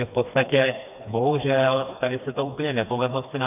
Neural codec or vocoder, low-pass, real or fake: codec, 16 kHz, 2 kbps, FreqCodec, smaller model; 3.6 kHz; fake